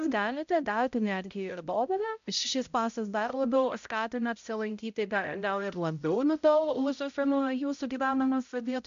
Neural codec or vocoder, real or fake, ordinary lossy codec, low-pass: codec, 16 kHz, 0.5 kbps, X-Codec, HuBERT features, trained on balanced general audio; fake; MP3, 64 kbps; 7.2 kHz